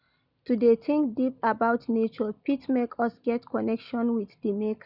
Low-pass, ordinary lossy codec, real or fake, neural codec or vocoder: 5.4 kHz; none; real; none